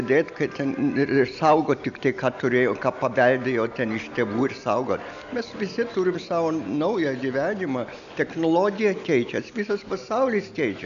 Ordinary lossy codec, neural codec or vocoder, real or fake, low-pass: MP3, 96 kbps; none; real; 7.2 kHz